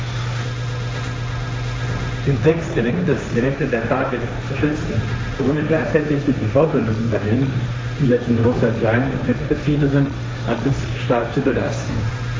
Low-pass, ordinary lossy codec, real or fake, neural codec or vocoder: none; none; fake; codec, 16 kHz, 1.1 kbps, Voila-Tokenizer